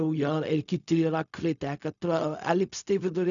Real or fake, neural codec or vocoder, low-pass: fake; codec, 16 kHz, 0.4 kbps, LongCat-Audio-Codec; 7.2 kHz